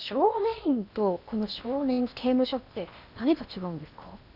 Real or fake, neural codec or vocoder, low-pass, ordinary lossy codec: fake; codec, 16 kHz in and 24 kHz out, 0.8 kbps, FocalCodec, streaming, 65536 codes; 5.4 kHz; none